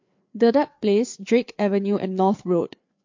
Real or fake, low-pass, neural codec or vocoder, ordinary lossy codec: fake; 7.2 kHz; codec, 16 kHz, 4 kbps, FreqCodec, larger model; MP3, 48 kbps